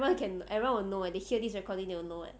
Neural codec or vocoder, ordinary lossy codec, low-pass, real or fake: none; none; none; real